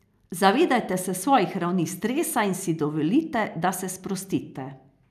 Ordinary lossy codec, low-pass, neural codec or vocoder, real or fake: none; 14.4 kHz; none; real